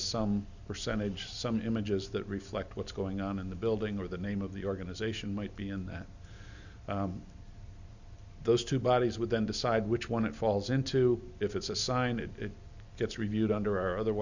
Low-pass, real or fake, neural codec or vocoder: 7.2 kHz; real; none